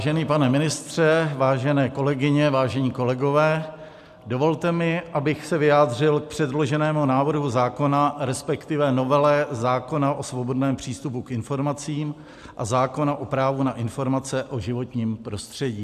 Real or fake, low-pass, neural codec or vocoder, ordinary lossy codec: real; 14.4 kHz; none; AAC, 96 kbps